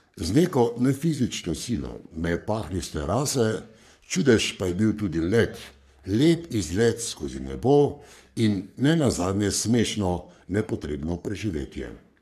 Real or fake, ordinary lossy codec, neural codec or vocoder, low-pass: fake; none; codec, 44.1 kHz, 3.4 kbps, Pupu-Codec; 14.4 kHz